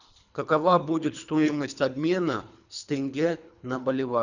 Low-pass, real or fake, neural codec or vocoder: 7.2 kHz; fake; codec, 24 kHz, 3 kbps, HILCodec